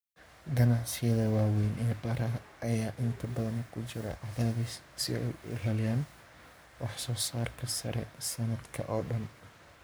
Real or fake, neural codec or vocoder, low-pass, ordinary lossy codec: fake; codec, 44.1 kHz, 7.8 kbps, Pupu-Codec; none; none